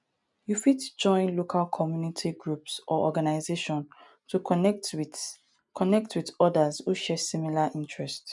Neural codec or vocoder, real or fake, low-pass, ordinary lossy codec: none; real; 10.8 kHz; none